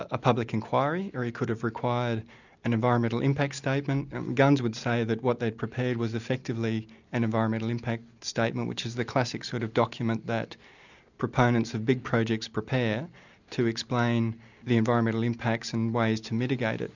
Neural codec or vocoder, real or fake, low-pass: none; real; 7.2 kHz